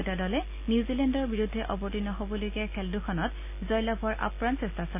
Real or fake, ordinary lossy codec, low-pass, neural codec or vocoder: real; none; 3.6 kHz; none